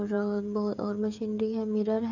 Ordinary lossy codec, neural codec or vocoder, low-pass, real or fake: none; codec, 16 kHz, 16 kbps, FreqCodec, smaller model; 7.2 kHz; fake